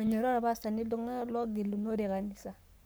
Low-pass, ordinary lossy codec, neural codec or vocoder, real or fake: none; none; codec, 44.1 kHz, 7.8 kbps, Pupu-Codec; fake